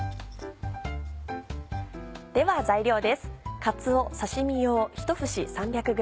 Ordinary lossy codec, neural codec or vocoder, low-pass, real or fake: none; none; none; real